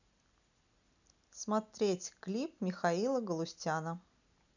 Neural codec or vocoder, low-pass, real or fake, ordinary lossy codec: none; 7.2 kHz; real; none